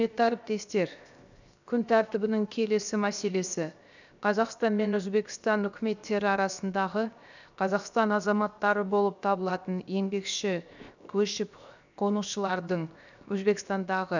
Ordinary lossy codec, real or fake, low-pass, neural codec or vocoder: none; fake; 7.2 kHz; codec, 16 kHz, 0.7 kbps, FocalCodec